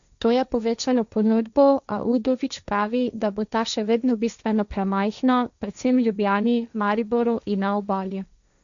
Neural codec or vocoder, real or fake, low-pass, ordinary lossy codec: codec, 16 kHz, 1.1 kbps, Voila-Tokenizer; fake; 7.2 kHz; none